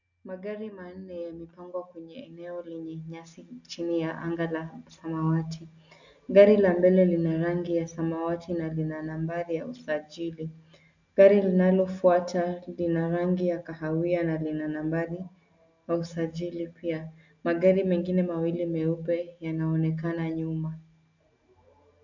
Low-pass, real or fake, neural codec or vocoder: 7.2 kHz; real; none